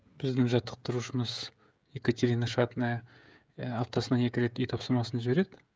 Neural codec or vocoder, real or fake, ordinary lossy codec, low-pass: codec, 16 kHz, 16 kbps, FreqCodec, smaller model; fake; none; none